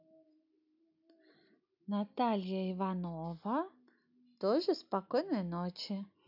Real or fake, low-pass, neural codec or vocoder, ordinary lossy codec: real; 5.4 kHz; none; none